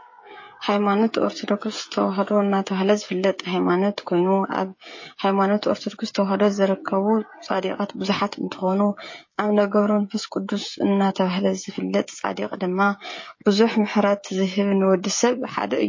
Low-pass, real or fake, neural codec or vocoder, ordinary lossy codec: 7.2 kHz; fake; codec, 16 kHz, 16 kbps, FreqCodec, smaller model; MP3, 32 kbps